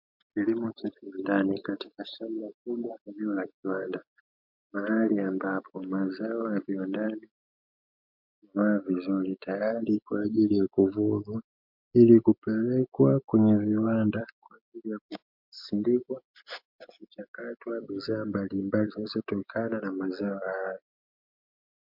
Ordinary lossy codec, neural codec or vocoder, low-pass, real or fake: MP3, 48 kbps; none; 5.4 kHz; real